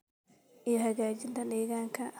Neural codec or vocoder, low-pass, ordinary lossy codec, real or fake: none; none; none; real